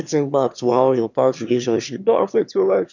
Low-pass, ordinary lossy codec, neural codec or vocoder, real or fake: 7.2 kHz; none; autoencoder, 22.05 kHz, a latent of 192 numbers a frame, VITS, trained on one speaker; fake